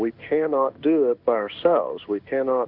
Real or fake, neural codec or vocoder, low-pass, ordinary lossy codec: fake; codec, 16 kHz in and 24 kHz out, 1 kbps, XY-Tokenizer; 5.4 kHz; Opus, 24 kbps